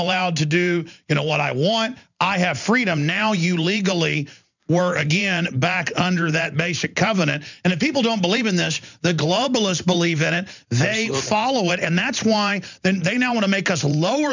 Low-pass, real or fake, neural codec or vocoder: 7.2 kHz; fake; vocoder, 44.1 kHz, 128 mel bands every 512 samples, BigVGAN v2